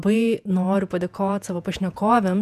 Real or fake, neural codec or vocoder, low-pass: fake; vocoder, 48 kHz, 128 mel bands, Vocos; 14.4 kHz